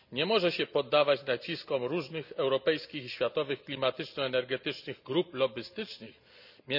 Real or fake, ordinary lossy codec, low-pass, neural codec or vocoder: real; none; 5.4 kHz; none